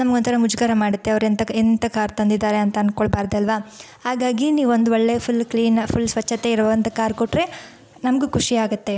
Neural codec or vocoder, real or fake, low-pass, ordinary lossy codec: none; real; none; none